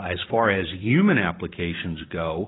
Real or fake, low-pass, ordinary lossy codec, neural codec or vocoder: fake; 7.2 kHz; AAC, 16 kbps; vocoder, 44.1 kHz, 128 mel bands every 512 samples, BigVGAN v2